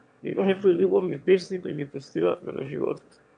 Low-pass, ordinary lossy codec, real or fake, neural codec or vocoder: 9.9 kHz; MP3, 64 kbps; fake; autoencoder, 22.05 kHz, a latent of 192 numbers a frame, VITS, trained on one speaker